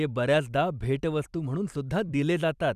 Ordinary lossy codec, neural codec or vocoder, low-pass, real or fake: none; vocoder, 44.1 kHz, 128 mel bands every 256 samples, BigVGAN v2; 14.4 kHz; fake